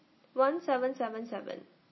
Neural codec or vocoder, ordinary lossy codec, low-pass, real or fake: none; MP3, 24 kbps; 7.2 kHz; real